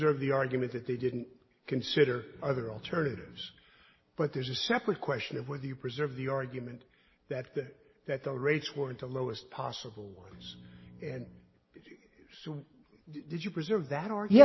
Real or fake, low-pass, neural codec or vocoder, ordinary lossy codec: real; 7.2 kHz; none; MP3, 24 kbps